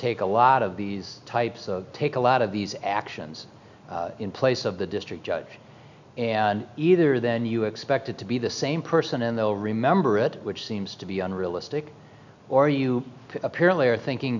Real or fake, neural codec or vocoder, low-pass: real; none; 7.2 kHz